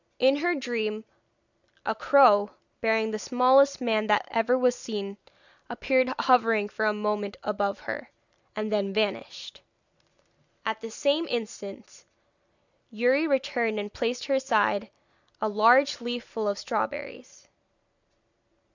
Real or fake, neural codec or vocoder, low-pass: real; none; 7.2 kHz